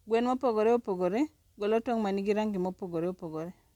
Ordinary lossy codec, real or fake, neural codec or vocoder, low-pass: MP3, 96 kbps; real; none; 19.8 kHz